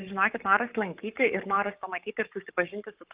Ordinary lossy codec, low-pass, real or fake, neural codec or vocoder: Opus, 24 kbps; 3.6 kHz; fake; codec, 44.1 kHz, 7.8 kbps, Pupu-Codec